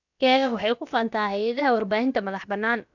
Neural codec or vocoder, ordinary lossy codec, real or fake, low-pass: codec, 16 kHz, about 1 kbps, DyCAST, with the encoder's durations; none; fake; 7.2 kHz